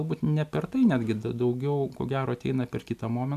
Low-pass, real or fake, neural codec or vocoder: 14.4 kHz; real; none